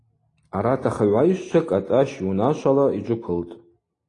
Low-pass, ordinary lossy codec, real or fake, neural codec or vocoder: 10.8 kHz; AAC, 32 kbps; real; none